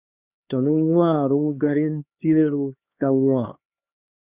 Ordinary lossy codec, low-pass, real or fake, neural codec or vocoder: Opus, 64 kbps; 3.6 kHz; fake; codec, 16 kHz, 2 kbps, X-Codec, HuBERT features, trained on LibriSpeech